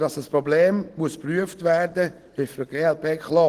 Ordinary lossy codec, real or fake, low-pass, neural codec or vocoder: Opus, 16 kbps; real; 14.4 kHz; none